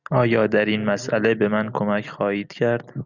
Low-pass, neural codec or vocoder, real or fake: 7.2 kHz; none; real